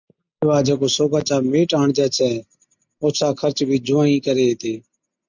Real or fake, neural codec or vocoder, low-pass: real; none; 7.2 kHz